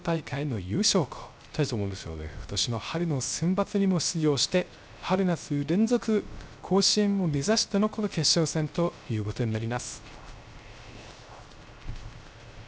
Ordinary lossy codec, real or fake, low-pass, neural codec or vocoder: none; fake; none; codec, 16 kHz, 0.3 kbps, FocalCodec